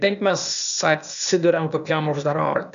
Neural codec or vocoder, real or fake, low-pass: codec, 16 kHz, 0.8 kbps, ZipCodec; fake; 7.2 kHz